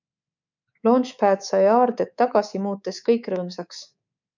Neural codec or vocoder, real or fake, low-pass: codec, 24 kHz, 3.1 kbps, DualCodec; fake; 7.2 kHz